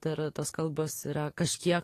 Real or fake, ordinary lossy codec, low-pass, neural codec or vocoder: fake; AAC, 48 kbps; 14.4 kHz; codec, 44.1 kHz, 7.8 kbps, DAC